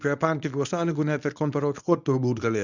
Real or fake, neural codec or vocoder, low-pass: fake; codec, 24 kHz, 0.9 kbps, WavTokenizer, medium speech release version 1; 7.2 kHz